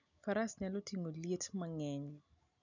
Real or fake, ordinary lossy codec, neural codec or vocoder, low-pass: real; none; none; 7.2 kHz